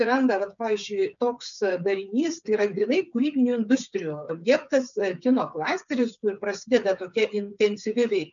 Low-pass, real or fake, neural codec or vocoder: 7.2 kHz; fake; codec, 16 kHz, 8 kbps, FreqCodec, smaller model